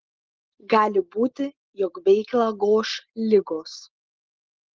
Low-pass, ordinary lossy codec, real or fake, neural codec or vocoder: 7.2 kHz; Opus, 16 kbps; real; none